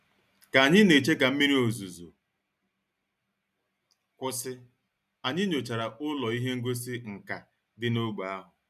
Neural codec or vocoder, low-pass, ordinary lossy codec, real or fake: none; 14.4 kHz; none; real